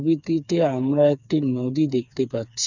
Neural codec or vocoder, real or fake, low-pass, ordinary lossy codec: codec, 16 kHz, 4 kbps, FreqCodec, smaller model; fake; 7.2 kHz; none